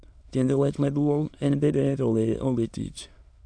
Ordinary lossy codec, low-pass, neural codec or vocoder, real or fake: none; 9.9 kHz; autoencoder, 22.05 kHz, a latent of 192 numbers a frame, VITS, trained on many speakers; fake